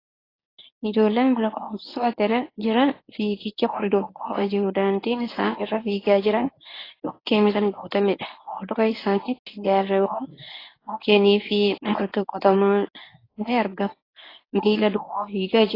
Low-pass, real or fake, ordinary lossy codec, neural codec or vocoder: 5.4 kHz; fake; AAC, 24 kbps; codec, 24 kHz, 0.9 kbps, WavTokenizer, medium speech release version 1